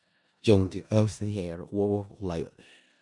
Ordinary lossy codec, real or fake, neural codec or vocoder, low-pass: MP3, 96 kbps; fake; codec, 16 kHz in and 24 kHz out, 0.4 kbps, LongCat-Audio-Codec, four codebook decoder; 10.8 kHz